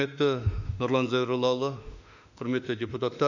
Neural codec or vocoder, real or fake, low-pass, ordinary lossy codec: autoencoder, 48 kHz, 32 numbers a frame, DAC-VAE, trained on Japanese speech; fake; 7.2 kHz; none